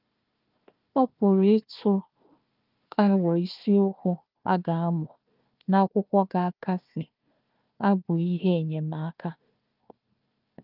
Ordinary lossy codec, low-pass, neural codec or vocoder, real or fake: Opus, 24 kbps; 5.4 kHz; codec, 16 kHz, 1 kbps, FunCodec, trained on Chinese and English, 50 frames a second; fake